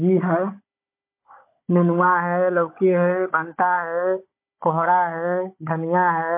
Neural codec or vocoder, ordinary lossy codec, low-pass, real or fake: codec, 16 kHz, 16 kbps, FunCodec, trained on Chinese and English, 50 frames a second; MP3, 24 kbps; 3.6 kHz; fake